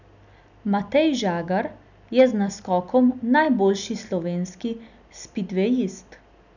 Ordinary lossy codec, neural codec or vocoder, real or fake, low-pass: none; none; real; 7.2 kHz